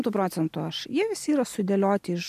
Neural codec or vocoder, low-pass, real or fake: none; 14.4 kHz; real